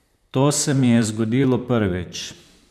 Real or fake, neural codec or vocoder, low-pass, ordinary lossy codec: fake; vocoder, 44.1 kHz, 128 mel bands, Pupu-Vocoder; 14.4 kHz; none